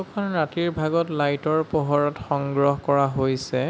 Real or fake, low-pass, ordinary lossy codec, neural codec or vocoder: real; none; none; none